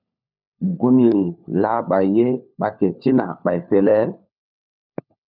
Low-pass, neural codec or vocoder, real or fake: 5.4 kHz; codec, 16 kHz, 4 kbps, FunCodec, trained on LibriTTS, 50 frames a second; fake